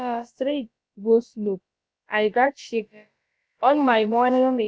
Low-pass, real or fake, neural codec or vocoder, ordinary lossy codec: none; fake; codec, 16 kHz, about 1 kbps, DyCAST, with the encoder's durations; none